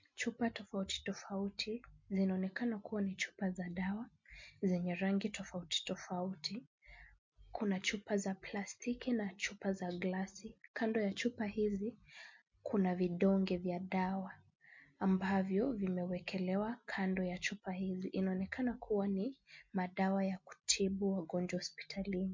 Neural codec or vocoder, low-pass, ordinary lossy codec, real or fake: none; 7.2 kHz; MP3, 48 kbps; real